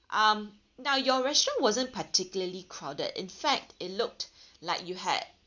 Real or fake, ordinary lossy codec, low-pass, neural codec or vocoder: real; none; 7.2 kHz; none